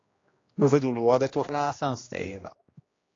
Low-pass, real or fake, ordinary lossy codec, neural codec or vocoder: 7.2 kHz; fake; AAC, 32 kbps; codec, 16 kHz, 1 kbps, X-Codec, HuBERT features, trained on general audio